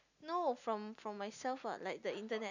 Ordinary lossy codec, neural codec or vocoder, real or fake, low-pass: none; none; real; 7.2 kHz